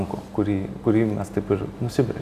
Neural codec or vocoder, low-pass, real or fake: none; 14.4 kHz; real